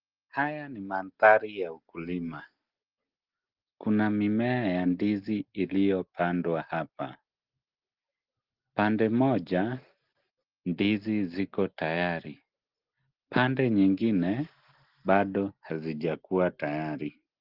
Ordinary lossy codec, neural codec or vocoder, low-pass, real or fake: Opus, 16 kbps; none; 5.4 kHz; real